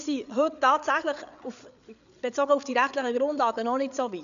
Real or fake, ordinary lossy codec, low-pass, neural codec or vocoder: fake; none; 7.2 kHz; codec, 16 kHz, 8 kbps, FreqCodec, larger model